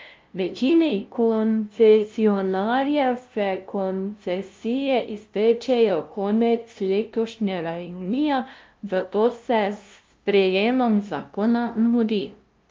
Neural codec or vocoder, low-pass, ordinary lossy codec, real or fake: codec, 16 kHz, 0.5 kbps, FunCodec, trained on LibriTTS, 25 frames a second; 7.2 kHz; Opus, 24 kbps; fake